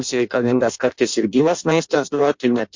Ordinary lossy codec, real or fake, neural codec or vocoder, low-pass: MP3, 48 kbps; fake; codec, 16 kHz in and 24 kHz out, 0.6 kbps, FireRedTTS-2 codec; 7.2 kHz